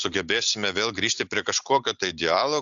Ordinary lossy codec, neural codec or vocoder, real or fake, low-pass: Opus, 64 kbps; none; real; 7.2 kHz